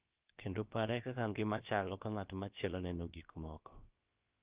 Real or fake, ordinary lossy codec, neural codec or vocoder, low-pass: fake; Opus, 32 kbps; codec, 16 kHz, about 1 kbps, DyCAST, with the encoder's durations; 3.6 kHz